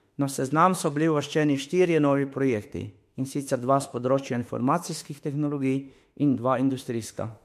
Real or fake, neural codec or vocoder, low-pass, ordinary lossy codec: fake; autoencoder, 48 kHz, 32 numbers a frame, DAC-VAE, trained on Japanese speech; 14.4 kHz; MP3, 64 kbps